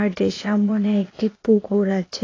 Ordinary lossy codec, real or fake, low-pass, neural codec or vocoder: AAC, 32 kbps; fake; 7.2 kHz; codec, 16 kHz, 0.8 kbps, ZipCodec